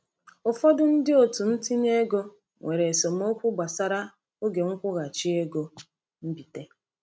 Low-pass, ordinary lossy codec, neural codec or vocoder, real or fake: none; none; none; real